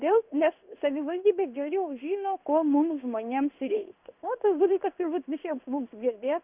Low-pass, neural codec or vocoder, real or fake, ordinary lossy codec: 3.6 kHz; codec, 16 kHz in and 24 kHz out, 1 kbps, XY-Tokenizer; fake; Opus, 64 kbps